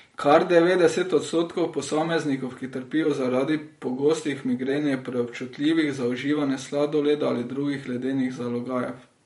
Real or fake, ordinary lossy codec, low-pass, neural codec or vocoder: fake; MP3, 48 kbps; 19.8 kHz; vocoder, 44.1 kHz, 128 mel bands every 512 samples, BigVGAN v2